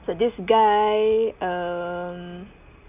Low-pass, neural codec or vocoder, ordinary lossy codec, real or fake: 3.6 kHz; none; none; real